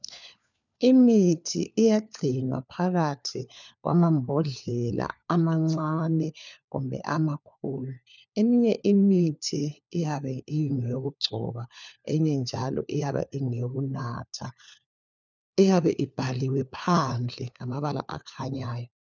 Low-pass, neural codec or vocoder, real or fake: 7.2 kHz; codec, 16 kHz, 4 kbps, FunCodec, trained on LibriTTS, 50 frames a second; fake